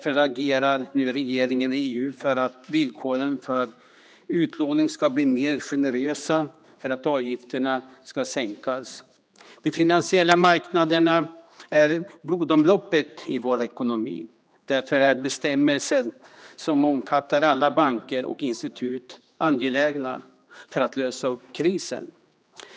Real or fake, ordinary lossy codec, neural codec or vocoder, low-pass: fake; none; codec, 16 kHz, 2 kbps, X-Codec, HuBERT features, trained on general audio; none